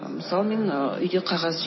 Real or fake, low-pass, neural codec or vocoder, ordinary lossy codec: real; 7.2 kHz; none; MP3, 24 kbps